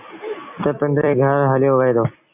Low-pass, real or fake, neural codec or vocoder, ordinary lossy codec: 3.6 kHz; fake; vocoder, 44.1 kHz, 128 mel bands every 256 samples, BigVGAN v2; MP3, 32 kbps